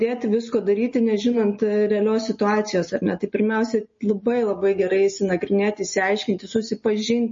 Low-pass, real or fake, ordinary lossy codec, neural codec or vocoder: 7.2 kHz; real; MP3, 32 kbps; none